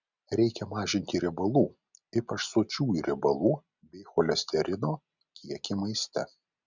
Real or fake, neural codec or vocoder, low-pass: real; none; 7.2 kHz